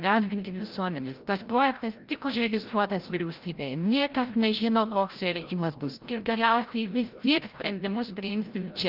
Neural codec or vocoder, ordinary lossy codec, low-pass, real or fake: codec, 16 kHz, 0.5 kbps, FreqCodec, larger model; Opus, 32 kbps; 5.4 kHz; fake